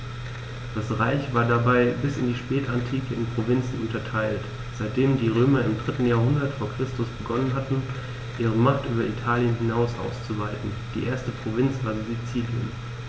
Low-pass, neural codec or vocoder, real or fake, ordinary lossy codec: none; none; real; none